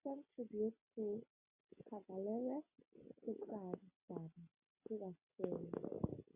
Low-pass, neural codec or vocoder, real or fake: 3.6 kHz; none; real